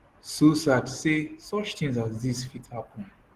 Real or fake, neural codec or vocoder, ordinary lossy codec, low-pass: real; none; Opus, 16 kbps; 14.4 kHz